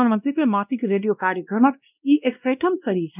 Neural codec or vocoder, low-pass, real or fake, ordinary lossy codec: codec, 16 kHz, 1 kbps, X-Codec, WavLM features, trained on Multilingual LibriSpeech; 3.6 kHz; fake; none